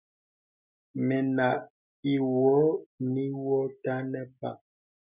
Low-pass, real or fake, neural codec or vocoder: 3.6 kHz; real; none